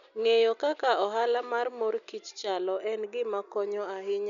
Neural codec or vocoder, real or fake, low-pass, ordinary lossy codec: none; real; 7.2 kHz; none